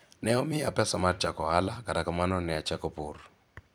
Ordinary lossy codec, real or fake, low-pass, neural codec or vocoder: none; fake; none; vocoder, 44.1 kHz, 128 mel bands every 256 samples, BigVGAN v2